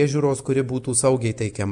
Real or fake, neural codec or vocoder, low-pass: real; none; 10.8 kHz